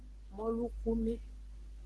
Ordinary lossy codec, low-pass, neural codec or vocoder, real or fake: Opus, 16 kbps; 10.8 kHz; none; real